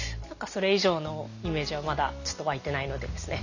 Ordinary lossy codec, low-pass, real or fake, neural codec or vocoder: none; 7.2 kHz; real; none